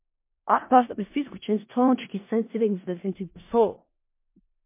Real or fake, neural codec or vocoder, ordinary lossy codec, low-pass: fake; codec, 16 kHz in and 24 kHz out, 0.4 kbps, LongCat-Audio-Codec, four codebook decoder; MP3, 24 kbps; 3.6 kHz